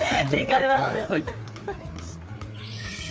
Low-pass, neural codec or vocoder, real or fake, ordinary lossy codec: none; codec, 16 kHz, 4 kbps, FreqCodec, larger model; fake; none